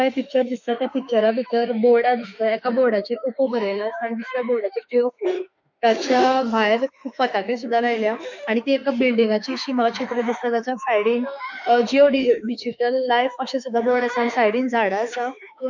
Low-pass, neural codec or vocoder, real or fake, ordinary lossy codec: 7.2 kHz; autoencoder, 48 kHz, 32 numbers a frame, DAC-VAE, trained on Japanese speech; fake; none